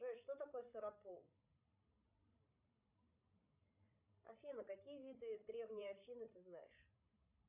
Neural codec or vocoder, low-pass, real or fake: codec, 16 kHz, 16 kbps, FreqCodec, larger model; 3.6 kHz; fake